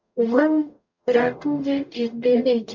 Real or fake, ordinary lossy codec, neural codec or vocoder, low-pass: fake; MP3, 64 kbps; codec, 44.1 kHz, 0.9 kbps, DAC; 7.2 kHz